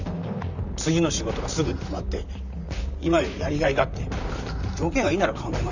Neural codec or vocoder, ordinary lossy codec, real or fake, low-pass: vocoder, 44.1 kHz, 128 mel bands, Pupu-Vocoder; none; fake; 7.2 kHz